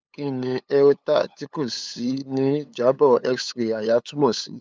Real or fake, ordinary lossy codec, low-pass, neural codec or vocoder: fake; none; none; codec, 16 kHz, 8 kbps, FunCodec, trained on LibriTTS, 25 frames a second